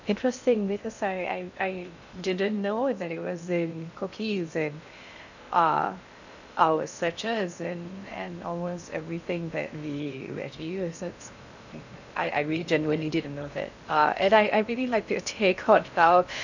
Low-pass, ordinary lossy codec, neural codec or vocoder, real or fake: 7.2 kHz; none; codec, 16 kHz in and 24 kHz out, 0.6 kbps, FocalCodec, streaming, 2048 codes; fake